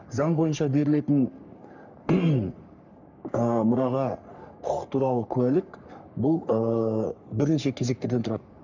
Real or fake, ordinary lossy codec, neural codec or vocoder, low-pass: fake; none; codec, 44.1 kHz, 3.4 kbps, Pupu-Codec; 7.2 kHz